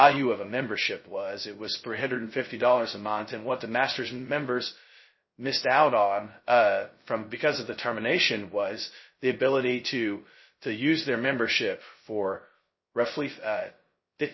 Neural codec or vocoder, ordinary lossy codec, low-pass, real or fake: codec, 16 kHz, 0.2 kbps, FocalCodec; MP3, 24 kbps; 7.2 kHz; fake